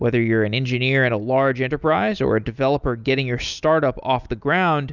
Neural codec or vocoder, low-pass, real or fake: none; 7.2 kHz; real